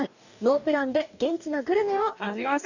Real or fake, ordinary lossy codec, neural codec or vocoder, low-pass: fake; none; codec, 44.1 kHz, 2.6 kbps, DAC; 7.2 kHz